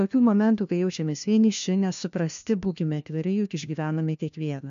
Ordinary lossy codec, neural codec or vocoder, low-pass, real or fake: MP3, 64 kbps; codec, 16 kHz, 1 kbps, FunCodec, trained on LibriTTS, 50 frames a second; 7.2 kHz; fake